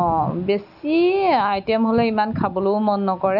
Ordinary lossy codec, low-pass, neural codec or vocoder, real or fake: none; 5.4 kHz; none; real